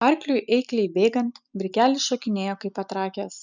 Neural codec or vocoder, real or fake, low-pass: none; real; 7.2 kHz